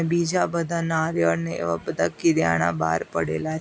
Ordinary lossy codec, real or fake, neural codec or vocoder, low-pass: none; real; none; none